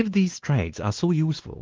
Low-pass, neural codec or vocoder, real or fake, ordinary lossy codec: 7.2 kHz; codec, 16 kHz, 2 kbps, X-Codec, WavLM features, trained on Multilingual LibriSpeech; fake; Opus, 16 kbps